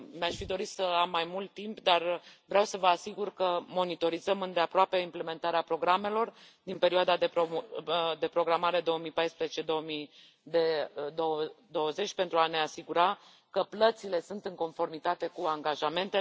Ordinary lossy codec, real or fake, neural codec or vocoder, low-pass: none; real; none; none